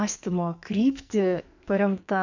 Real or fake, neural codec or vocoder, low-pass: fake; codec, 44.1 kHz, 2.6 kbps, SNAC; 7.2 kHz